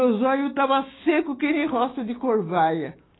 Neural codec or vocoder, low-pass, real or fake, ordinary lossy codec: codec, 16 kHz, 6 kbps, DAC; 7.2 kHz; fake; AAC, 16 kbps